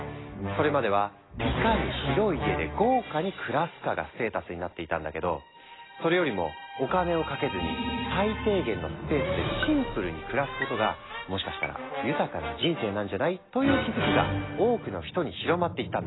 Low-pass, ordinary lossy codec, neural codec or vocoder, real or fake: 7.2 kHz; AAC, 16 kbps; none; real